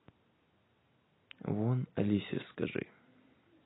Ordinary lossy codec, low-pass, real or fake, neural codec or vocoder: AAC, 16 kbps; 7.2 kHz; real; none